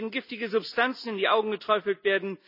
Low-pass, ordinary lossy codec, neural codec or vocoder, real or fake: 5.4 kHz; none; none; real